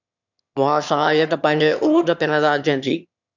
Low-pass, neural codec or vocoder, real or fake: 7.2 kHz; autoencoder, 22.05 kHz, a latent of 192 numbers a frame, VITS, trained on one speaker; fake